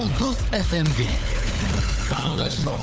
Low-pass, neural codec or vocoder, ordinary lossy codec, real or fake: none; codec, 16 kHz, 4 kbps, FunCodec, trained on LibriTTS, 50 frames a second; none; fake